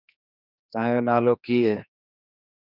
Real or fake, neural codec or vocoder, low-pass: fake; codec, 16 kHz, 2 kbps, X-Codec, HuBERT features, trained on general audio; 5.4 kHz